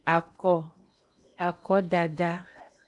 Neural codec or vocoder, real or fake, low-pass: codec, 16 kHz in and 24 kHz out, 0.8 kbps, FocalCodec, streaming, 65536 codes; fake; 10.8 kHz